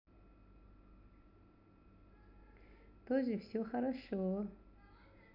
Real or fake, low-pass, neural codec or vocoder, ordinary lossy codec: real; 5.4 kHz; none; none